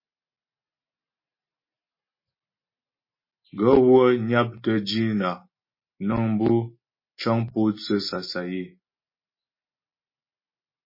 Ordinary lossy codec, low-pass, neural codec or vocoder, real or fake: MP3, 24 kbps; 5.4 kHz; none; real